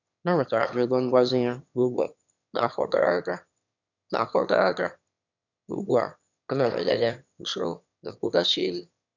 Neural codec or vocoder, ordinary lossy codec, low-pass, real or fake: autoencoder, 22.05 kHz, a latent of 192 numbers a frame, VITS, trained on one speaker; none; 7.2 kHz; fake